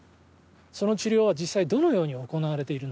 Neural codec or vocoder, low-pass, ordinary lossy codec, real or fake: none; none; none; real